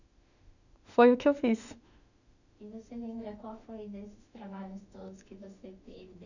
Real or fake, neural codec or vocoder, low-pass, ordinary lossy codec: fake; autoencoder, 48 kHz, 32 numbers a frame, DAC-VAE, trained on Japanese speech; 7.2 kHz; none